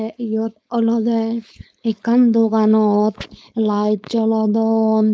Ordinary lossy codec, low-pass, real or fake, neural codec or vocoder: none; none; fake; codec, 16 kHz, 4.8 kbps, FACodec